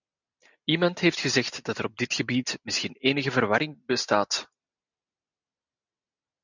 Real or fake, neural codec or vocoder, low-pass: real; none; 7.2 kHz